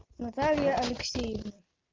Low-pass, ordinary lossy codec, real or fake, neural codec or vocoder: 7.2 kHz; Opus, 24 kbps; real; none